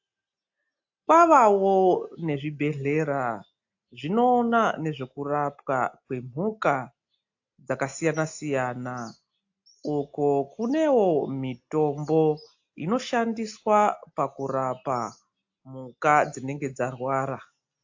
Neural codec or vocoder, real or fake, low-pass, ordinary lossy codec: none; real; 7.2 kHz; AAC, 48 kbps